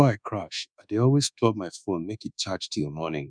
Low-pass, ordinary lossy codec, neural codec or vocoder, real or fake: 9.9 kHz; none; codec, 24 kHz, 1.2 kbps, DualCodec; fake